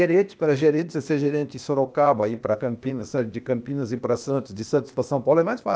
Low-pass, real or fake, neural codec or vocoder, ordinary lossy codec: none; fake; codec, 16 kHz, 0.8 kbps, ZipCodec; none